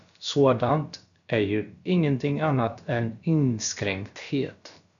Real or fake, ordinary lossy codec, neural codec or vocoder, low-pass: fake; MP3, 96 kbps; codec, 16 kHz, about 1 kbps, DyCAST, with the encoder's durations; 7.2 kHz